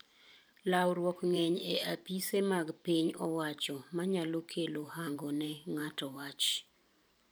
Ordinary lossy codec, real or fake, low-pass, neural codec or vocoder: none; fake; none; vocoder, 44.1 kHz, 128 mel bands every 512 samples, BigVGAN v2